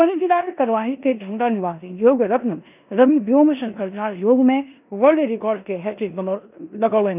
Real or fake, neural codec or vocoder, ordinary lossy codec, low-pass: fake; codec, 16 kHz in and 24 kHz out, 0.9 kbps, LongCat-Audio-Codec, four codebook decoder; none; 3.6 kHz